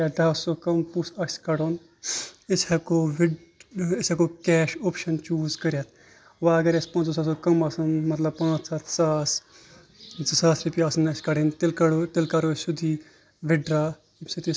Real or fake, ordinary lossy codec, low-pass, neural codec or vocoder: real; none; none; none